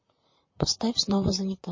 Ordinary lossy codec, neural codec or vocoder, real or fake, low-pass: MP3, 32 kbps; vocoder, 44.1 kHz, 128 mel bands, Pupu-Vocoder; fake; 7.2 kHz